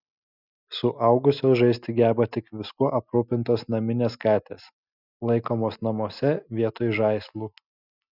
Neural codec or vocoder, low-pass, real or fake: none; 5.4 kHz; real